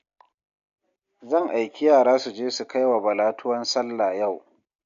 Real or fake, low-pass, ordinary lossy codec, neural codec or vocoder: real; 7.2 kHz; MP3, 48 kbps; none